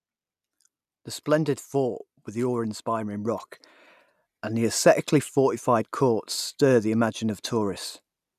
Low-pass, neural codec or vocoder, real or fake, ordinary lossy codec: 14.4 kHz; none; real; none